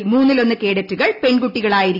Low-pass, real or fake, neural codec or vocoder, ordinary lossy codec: 5.4 kHz; real; none; none